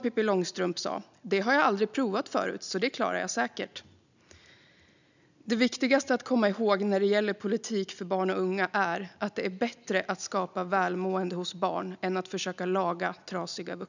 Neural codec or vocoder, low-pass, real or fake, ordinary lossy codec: none; 7.2 kHz; real; none